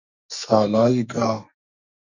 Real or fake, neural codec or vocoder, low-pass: fake; codec, 44.1 kHz, 2.6 kbps, SNAC; 7.2 kHz